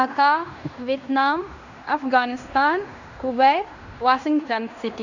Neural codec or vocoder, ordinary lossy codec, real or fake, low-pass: codec, 16 kHz in and 24 kHz out, 0.9 kbps, LongCat-Audio-Codec, fine tuned four codebook decoder; none; fake; 7.2 kHz